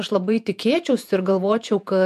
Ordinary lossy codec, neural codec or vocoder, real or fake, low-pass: MP3, 96 kbps; vocoder, 48 kHz, 128 mel bands, Vocos; fake; 14.4 kHz